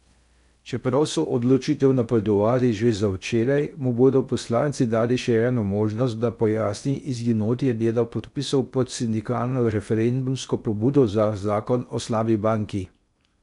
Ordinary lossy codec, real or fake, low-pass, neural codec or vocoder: none; fake; 10.8 kHz; codec, 16 kHz in and 24 kHz out, 0.6 kbps, FocalCodec, streaming, 2048 codes